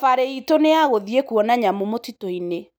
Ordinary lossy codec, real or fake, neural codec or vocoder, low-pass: none; real; none; none